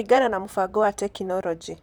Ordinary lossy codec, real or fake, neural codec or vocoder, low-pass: none; fake; vocoder, 44.1 kHz, 128 mel bands, Pupu-Vocoder; none